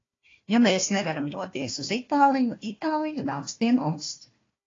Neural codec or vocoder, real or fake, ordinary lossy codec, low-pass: codec, 16 kHz, 1 kbps, FunCodec, trained on Chinese and English, 50 frames a second; fake; AAC, 32 kbps; 7.2 kHz